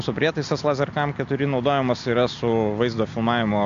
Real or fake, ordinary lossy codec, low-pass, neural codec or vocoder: real; AAC, 64 kbps; 7.2 kHz; none